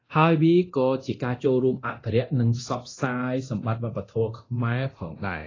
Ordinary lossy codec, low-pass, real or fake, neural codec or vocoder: AAC, 32 kbps; 7.2 kHz; fake; codec, 24 kHz, 0.9 kbps, DualCodec